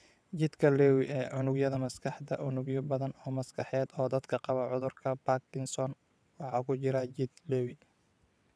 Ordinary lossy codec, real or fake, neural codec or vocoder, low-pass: none; fake; vocoder, 22.05 kHz, 80 mel bands, WaveNeXt; none